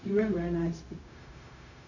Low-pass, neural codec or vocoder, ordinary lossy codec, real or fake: 7.2 kHz; codec, 16 kHz, 0.4 kbps, LongCat-Audio-Codec; none; fake